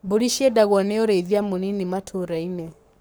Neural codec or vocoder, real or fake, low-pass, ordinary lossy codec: codec, 44.1 kHz, 7.8 kbps, Pupu-Codec; fake; none; none